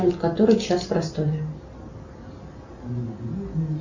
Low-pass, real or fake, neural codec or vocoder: 7.2 kHz; real; none